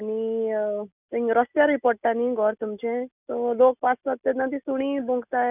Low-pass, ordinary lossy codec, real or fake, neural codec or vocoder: 3.6 kHz; none; real; none